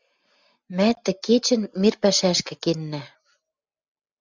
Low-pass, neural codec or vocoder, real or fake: 7.2 kHz; none; real